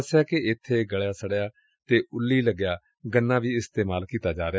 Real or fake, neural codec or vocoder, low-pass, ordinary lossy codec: real; none; none; none